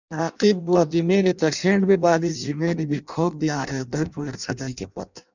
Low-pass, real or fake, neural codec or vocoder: 7.2 kHz; fake; codec, 16 kHz in and 24 kHz out, 0.6 kbps, FireRedTTS-2 codec